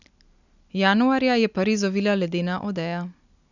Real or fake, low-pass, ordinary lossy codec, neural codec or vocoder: real; 7.2 kHz; none; none